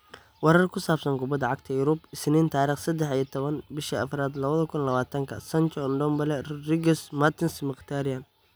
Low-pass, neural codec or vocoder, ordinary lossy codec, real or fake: none; none; none; real